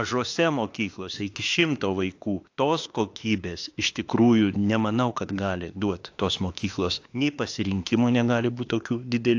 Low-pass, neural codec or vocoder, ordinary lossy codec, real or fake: 7.2 kHz; autoencoder, 48 kHz, 32 numbers a frame, DAC-VAE, trained on Japanese speech; AAC, 48 kbps; fake